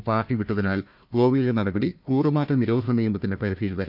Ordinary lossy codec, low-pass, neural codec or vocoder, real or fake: none; 5.4 kHz; codec, 16 kHz, 1 kbps, FunCodec, trained on Chinese and English, 50 frames a second; fake